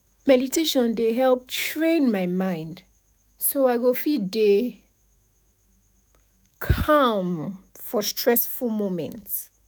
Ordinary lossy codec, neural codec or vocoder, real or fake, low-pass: none; autoencoder, 48 kHz, 128 numbers a frame, DAC-VAE, trained on Japanese speech; fake; none